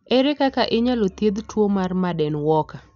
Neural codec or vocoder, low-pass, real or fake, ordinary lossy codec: none; 7.2 kHz; real; none